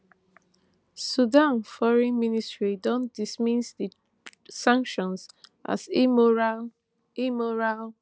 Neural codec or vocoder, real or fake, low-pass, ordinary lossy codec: none; real; none; none